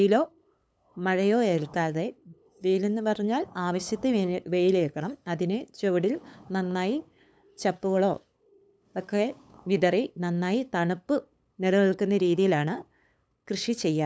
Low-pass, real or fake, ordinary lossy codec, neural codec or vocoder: none; fake; none; codec, 16 kHz, 8 kbps, FunCodec, trained on LibriTTS, 25 frames a second